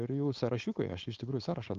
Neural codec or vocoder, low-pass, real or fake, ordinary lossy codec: codec, 16 kHz, 4.8 kbps, FACodec; 7.2 kHz; fake; Opus, 32 kbps